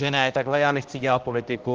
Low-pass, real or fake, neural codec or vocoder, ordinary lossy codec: 7.2 kHz; fake; codec, 16 kHz, 1 kbps, X-Codec, HuBERT features, trained on balanced general audio; Opus, 16 kbps